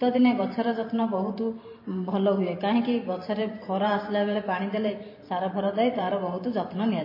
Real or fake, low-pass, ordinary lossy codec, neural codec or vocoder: fake; 5.4 kHz; MP3, 24 kbps; vocoder, 44.1 kHz, 128 mel bands every 512 samples, BigVGAN v2